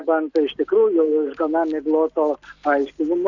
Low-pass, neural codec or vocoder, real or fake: 7.2 kHz; none; real